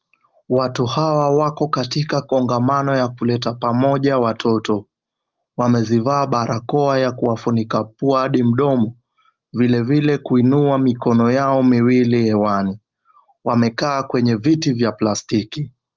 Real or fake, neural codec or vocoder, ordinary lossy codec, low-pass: real; none; Opus, 32 kbps; 7.2 kHz